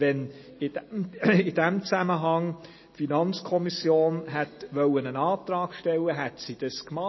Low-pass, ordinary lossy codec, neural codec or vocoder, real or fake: 7.2 kHz; MP3, 24 kbps; none; real